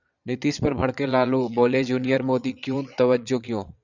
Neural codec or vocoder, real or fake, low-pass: vocoder, 22.05 kHz, 80 mel bands, Vocos; fake; 7.2 kHz